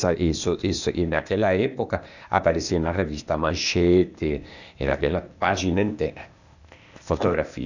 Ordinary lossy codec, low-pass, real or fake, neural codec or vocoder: none; 7.2 kHz; fake; codec, 16 kHz, 0.8 kbps, ZipCodec